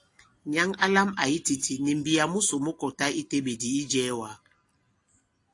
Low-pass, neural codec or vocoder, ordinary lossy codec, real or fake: 10.8 kHz; none; AAC, 48 kbps; real